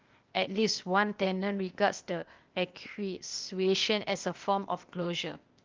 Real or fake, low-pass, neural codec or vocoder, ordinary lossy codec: fake; 7.2 kHz; codec, 16 kHz, 0.8 kbps, ZipCodec; Opus, 24 kbps